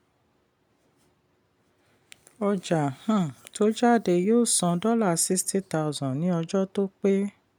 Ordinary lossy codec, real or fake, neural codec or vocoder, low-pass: none; real; none; none